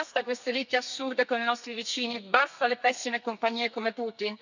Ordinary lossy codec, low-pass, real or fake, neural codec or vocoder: none; 7.2 kHz; fake; codec, 32 kHz, 1.9 kbps, SNAC